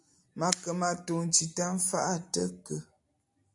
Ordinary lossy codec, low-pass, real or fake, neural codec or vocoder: AAC, 64 kbps; 10.8 kHz; real; none